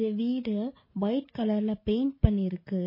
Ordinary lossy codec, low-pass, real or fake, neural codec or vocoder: MP3, 24 kbps; 5.4 kHz; real; none